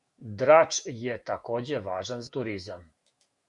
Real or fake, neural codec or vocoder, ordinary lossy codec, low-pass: fake; autoencoder, 48 kHz, 128 numbers a frame, DAC-VAE, trained on Japanese speech; Opus, 64 kbps; 10.8 kHz